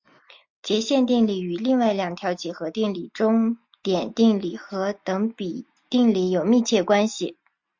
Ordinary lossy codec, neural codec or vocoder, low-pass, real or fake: MP3, 48 kbps; none; 7.2 kHz; real